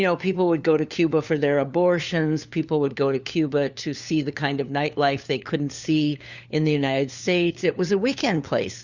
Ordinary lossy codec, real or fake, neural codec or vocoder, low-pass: Opus, 64 kbps; fake; codec, 16 kHz, 4 kbps, FunCodec, trained on LibriTTS, 50 frames a second; 7.2 kHz